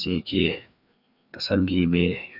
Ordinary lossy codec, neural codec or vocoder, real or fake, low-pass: none; codec, 16 kHz, 2 kbps, FreqCodec, larger model; fake; 5.4 kHz